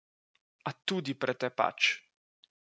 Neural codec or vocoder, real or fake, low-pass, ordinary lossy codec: none; real; none; none